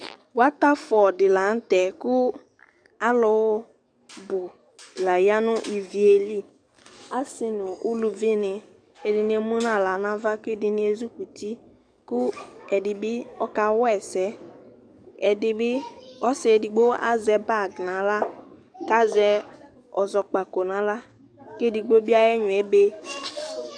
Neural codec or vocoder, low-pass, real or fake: codec, 44.1 kHz, 7.8 kbps, DAC; 9.9 kHz; fake